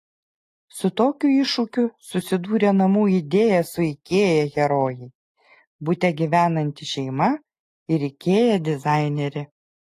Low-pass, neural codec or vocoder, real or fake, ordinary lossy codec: 14.4 kHz; none; real; AAC, 48 kbps